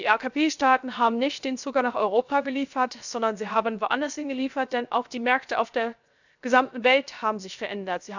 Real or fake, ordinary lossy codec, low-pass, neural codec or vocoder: fake; none; 7.2 kHz; codec, 16 kHz, 0.7 kbps, FocalCodec